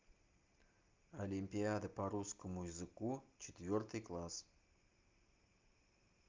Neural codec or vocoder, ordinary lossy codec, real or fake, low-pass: none; Opus, 24 kbps; real; 7.2 kHz